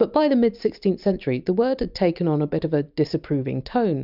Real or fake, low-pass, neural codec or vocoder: real; 5.4 kHz; none